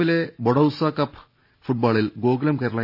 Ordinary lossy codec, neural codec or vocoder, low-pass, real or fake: none; none; 5.4 kHz; real